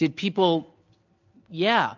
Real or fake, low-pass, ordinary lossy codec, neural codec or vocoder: real; 7.2 kHz; MP3, 64 kbps; none